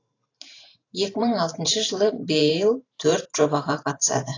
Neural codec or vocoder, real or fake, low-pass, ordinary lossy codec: vocoder, 44.1 kHz, 128 mel bands every 512 samples, BigVGAN v2; fake; 7.2 kHz; AAC, 32 kbps